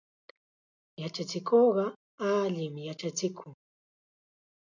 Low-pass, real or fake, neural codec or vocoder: 7.2 kHz; real; none